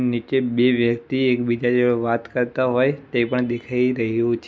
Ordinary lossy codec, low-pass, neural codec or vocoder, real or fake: none; none; none; real